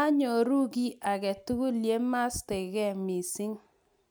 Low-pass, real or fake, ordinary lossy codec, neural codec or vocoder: none; real; none; none